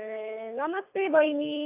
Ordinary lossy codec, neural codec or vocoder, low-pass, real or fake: AAC, 24 kbps; codec, 24 kHz, 3 kbps, HILCodec; 3.6 kHz; fake